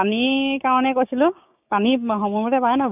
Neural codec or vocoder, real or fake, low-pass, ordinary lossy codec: codec, 16 kHz, 6 kbps, DAC; fake; 3.6 kHz; none